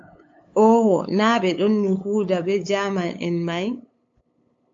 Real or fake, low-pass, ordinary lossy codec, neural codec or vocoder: fake; 7.2 kHz; AAC, 48 kbps; codec, 16 kHz, 8 kbps, FunCodec, trained on LibriTTS, 25 frames a second